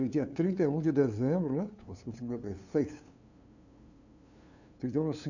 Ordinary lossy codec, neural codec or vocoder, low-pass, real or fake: none; codec, 16 kHz, 2 kbps, FunCodec, trained on LibriTTS, 25 frames a second; 7.2 kHz; fake